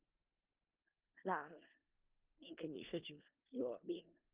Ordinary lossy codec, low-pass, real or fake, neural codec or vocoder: Opus, 32 kbps; 3.6 kHz; fake; codec, 16 kHz in and 24 kHz out, 0.4 kbps, LongCat-Audio-Codec, four codebook decoder